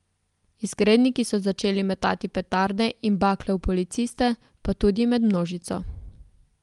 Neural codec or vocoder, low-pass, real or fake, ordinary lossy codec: none; 10.8 kHz; real; Opus, 32 kbps